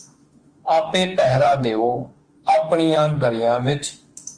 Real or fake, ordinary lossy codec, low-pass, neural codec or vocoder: fake; Opus, 24 kbps; 9.9 kHz; autoencoder, 48 kHz, 32 numbers a frame, DAC-VAE, trained on Japanese speech